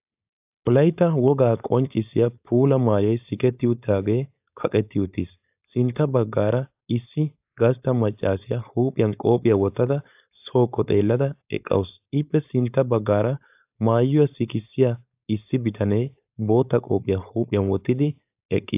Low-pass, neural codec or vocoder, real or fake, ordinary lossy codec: 3.6 kHz; codec, 16 kHz, 4.8 kbps, FACodec; fake; AAC, 32 kbps